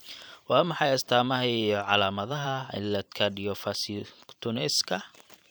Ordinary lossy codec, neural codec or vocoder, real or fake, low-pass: none; vocoder, 44.1 kHz, 128 mel bands every 512 samples, BigVGAN v2; fake; none